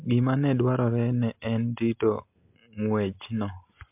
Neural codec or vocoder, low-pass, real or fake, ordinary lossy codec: none; 3.6 kHz; real; MP3, 32 kbps